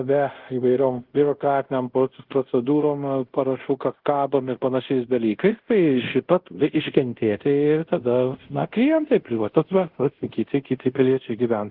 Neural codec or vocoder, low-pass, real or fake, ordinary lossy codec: codec, 24 kHz, 0.5 kbps, DualCodec; 5.4 kHz; fake; Opus, 16 kbps